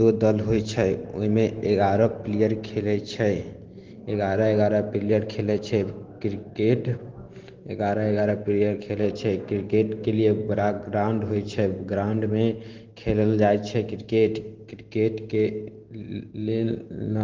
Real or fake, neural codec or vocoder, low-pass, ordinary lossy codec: real; none; 7.2 kHz; Opus, 16 kbps